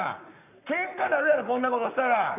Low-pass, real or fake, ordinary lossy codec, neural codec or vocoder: 3.6 kHz; fake; none; codec, 44.1 kHz, 7.8 kbps, Pupu-Codec